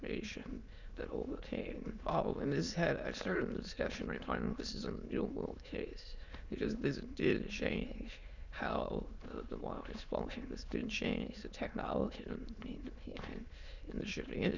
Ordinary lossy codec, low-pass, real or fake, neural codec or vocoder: Opus, 64 kbps; 7.2 kHz; fake; autoencoder, 22.05 kHz, a latent of 192 numbers a frame, VITS, trained on many speakers